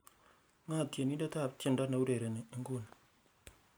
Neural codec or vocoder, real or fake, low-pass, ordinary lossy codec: none; real; none; none